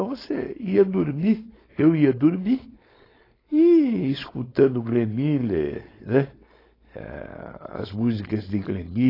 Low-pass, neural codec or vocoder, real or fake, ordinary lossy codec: 5.4 kHz; codec, 16 kHz, 4.8 kbps, FACodec; fake; AAC, 24 kbps